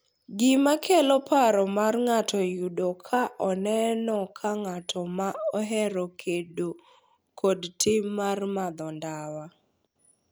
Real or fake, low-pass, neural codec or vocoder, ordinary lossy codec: real; none; none; none